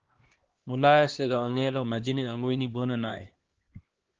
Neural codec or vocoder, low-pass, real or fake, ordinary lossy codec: codec, 16 kHz, 1 kbps, X-Codec, HuBERT features, trained on LibriSpeech; 7.2 kHz; fake; Opus, 16 kbps